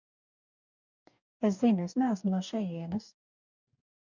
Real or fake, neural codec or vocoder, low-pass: fake; codec, 44.1 kHz, 2.6 kbps, DAC; 7.2 kHz